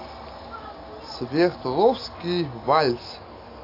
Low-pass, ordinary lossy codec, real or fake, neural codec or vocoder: 5.4 kHz; AAC, 32 kbps; real; none